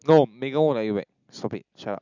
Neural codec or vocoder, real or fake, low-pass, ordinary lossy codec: none; real; 7.2 kHz; none